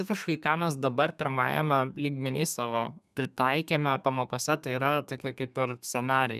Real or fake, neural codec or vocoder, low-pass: fake; codec, 32 kHz, 1.9 kbps, SNAC; 14.4 kHz